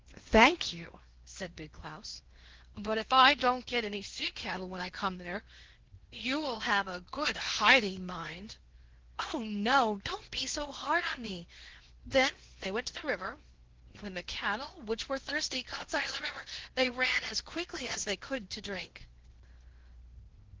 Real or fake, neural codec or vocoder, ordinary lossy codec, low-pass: fake; codec, 16 kHz in and 24 kHz out, 0.8 kbps, FocalCodec, streaming, 65536 codes; Opus, 16 kbps; 7.2 kHz